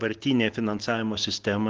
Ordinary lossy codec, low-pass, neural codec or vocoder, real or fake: Opus, 16 kbps; 7.2 kHz; none; real